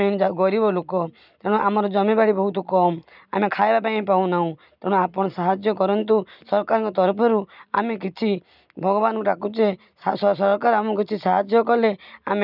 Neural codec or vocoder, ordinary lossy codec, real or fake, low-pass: none; none; real; 5.4 kHz